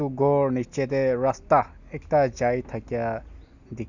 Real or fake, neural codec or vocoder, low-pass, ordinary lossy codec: real; none; 7.2 kHz; none